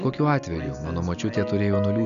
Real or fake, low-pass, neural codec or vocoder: real; 7.2 kHz; none